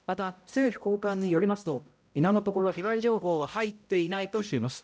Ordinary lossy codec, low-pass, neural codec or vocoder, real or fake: none; none; codec, 16 kHz, 0.5 kbps, X-Codec, HuBERT features, trained on balanced general audio; fake